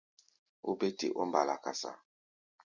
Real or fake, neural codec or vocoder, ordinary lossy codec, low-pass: real; none; Opus, 64 kbps; 7.2 kHz